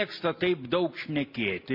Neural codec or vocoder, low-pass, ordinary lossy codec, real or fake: none; 5.4 kHz; MP3, 32 kbps; real